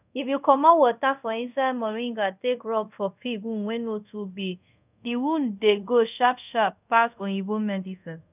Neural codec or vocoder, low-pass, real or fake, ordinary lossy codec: codec, 24 kHz, 0.5 kbps, DualCodec; 3.6 kHz; fake; none